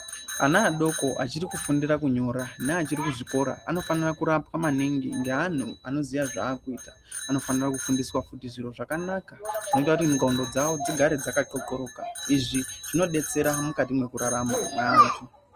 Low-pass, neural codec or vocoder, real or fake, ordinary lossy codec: 14.4 kHz; none; real; Opus, 24 kbps